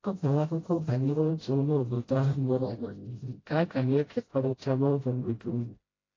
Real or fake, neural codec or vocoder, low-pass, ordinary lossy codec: fake; codec, 16 kHz, 0.5 kbps, FreqCodec, smaller model; 7.2 kHz; AAC, 32 kbps